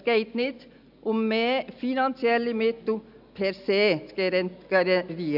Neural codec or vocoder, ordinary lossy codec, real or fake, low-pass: none; none; real; 5.4 kHz